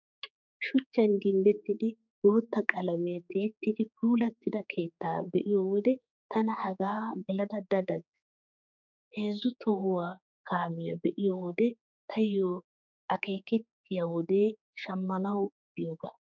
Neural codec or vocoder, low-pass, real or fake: codec, 16 kHz, 4 kbps, X-Codec, HuBERT features, trained on general audio; 7.2 kHz; fake